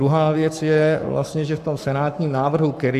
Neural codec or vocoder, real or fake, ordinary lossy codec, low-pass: codec, 44.1 kHz, 7.8 kbps, Pupu-Codec; fake; AAC, 96 kbps; 14.4 kHz